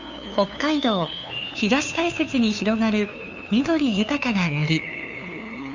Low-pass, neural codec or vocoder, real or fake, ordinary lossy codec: 7.2 kHz; codec, 16 kHz, 2 kbps, FunCodec, trained on LibriTTS, 25 frames a second; fake; none